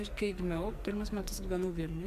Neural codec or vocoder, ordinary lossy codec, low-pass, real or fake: codec, 44.1 kHz, 2.6 kbps, SNAC; MP3, 96 kbps; 14.4 kHz; fake